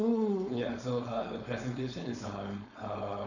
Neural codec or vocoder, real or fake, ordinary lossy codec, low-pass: codec, 16 kHz, 4.8 kbps, FACodec; fake; none; 7.2 kHz